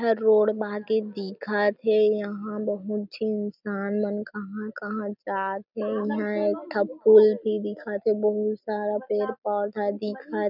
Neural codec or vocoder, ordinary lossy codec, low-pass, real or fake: none; none; 5.4 kHz; real